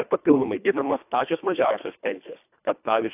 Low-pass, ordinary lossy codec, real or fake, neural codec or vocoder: 3.6 kHz; AAC, 32 kbps; fake; codec, 24 kHz, 1.5 kbps, HILCodec